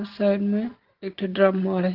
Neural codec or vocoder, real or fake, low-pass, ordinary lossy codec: none; real; 5.4 kHz; Opus, 16 kbps